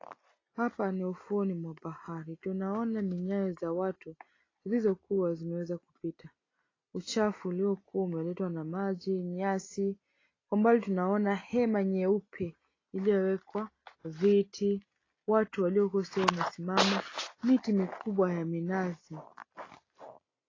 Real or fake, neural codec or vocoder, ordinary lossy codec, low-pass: real; none; AAC, 32 kbps; 7.2 kHz